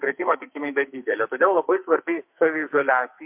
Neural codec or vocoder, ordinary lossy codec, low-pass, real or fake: codec, 44.1 kHz, 2.6 kbps, SNAC; MP3, 32 kbps; 3.6 kHz; fake